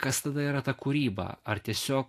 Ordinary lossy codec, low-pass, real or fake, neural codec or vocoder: AAC, 64 kbps; 14.4 kHz; real; none